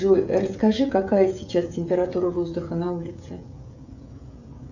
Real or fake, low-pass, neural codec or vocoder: fake; 7.2 kHz; codec, 16 kHz, 16 kbps, FreqCodec, smaller model